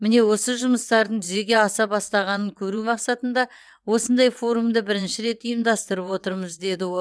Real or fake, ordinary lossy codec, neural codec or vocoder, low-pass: fake; none; vocoder, 22.05 kHz, 80 mel bands, WaveNeXt; none